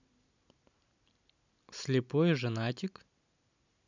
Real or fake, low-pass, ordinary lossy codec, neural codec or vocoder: real; 7.2 kHz; none; none